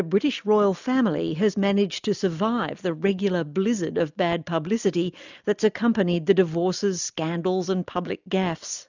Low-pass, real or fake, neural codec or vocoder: 7.2 kHz; real; none